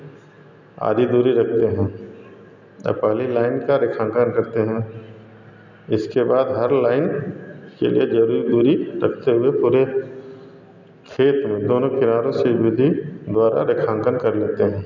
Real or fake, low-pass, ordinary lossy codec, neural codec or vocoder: real; 7.2 kHz; none; none